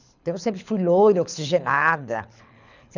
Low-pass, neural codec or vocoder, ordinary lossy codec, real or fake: 7.2 kHz; codec, 24 kHz, 6 kbps, HILCodec; none; fake